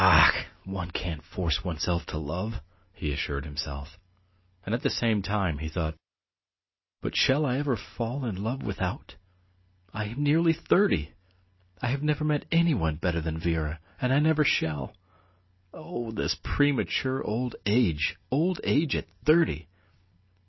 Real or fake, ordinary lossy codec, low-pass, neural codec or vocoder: real; MP3, 24 kbps; 7.2 kHz; none